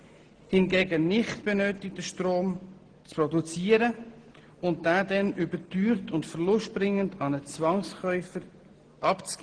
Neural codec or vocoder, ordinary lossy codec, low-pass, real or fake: none; Opus, 16 kbps; 9.9 kHz; real